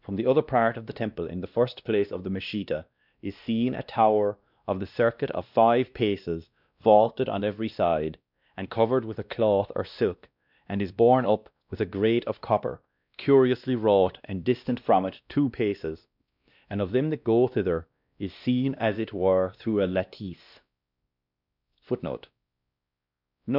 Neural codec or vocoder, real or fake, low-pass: codec, 16 kHz, 1 kbps, X-Codec, WavLM features, trained on Multilingual LibriSpeech; fake; 5.4 kHz